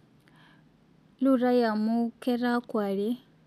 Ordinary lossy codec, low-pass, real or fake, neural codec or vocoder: none; 14.4 kHz; real; none